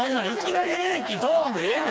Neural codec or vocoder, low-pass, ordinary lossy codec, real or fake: codec, 16 kHz, 2 kbps, FreqCodec, smaller model; none; none; fake